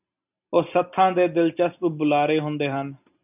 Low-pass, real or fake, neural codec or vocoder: 3.6 kHz; real; none